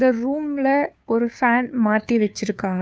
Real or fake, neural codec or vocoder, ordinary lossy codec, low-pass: fake; codec, 16 kHz, 2 kbps, FunCodec, trained on Chinese and English, 25 frames a second; none; none